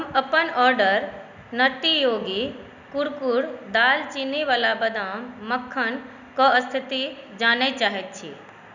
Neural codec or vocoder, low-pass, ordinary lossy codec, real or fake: none; 7.2 kHz; none; real